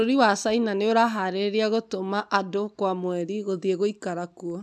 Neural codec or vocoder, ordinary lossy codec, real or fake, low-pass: none; none; real; none